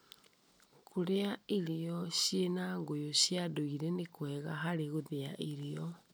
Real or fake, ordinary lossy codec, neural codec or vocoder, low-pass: real; none; none; none